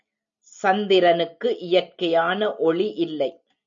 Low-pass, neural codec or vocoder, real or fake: 7.2 kHz; none; real